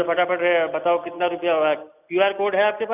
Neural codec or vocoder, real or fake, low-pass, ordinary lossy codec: none; real; 3.6 kHz; none